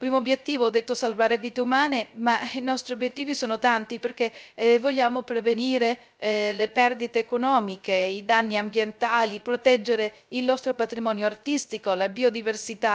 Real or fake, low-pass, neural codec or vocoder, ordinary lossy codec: fake; none; codec, 16 kHz, 0.3 kbps, FocalCodec; none